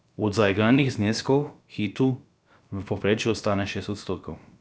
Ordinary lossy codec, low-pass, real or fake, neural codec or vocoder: none; none; fake; codec, 16 kHz, about 1 kbps, DyCAST, with the encoder's durations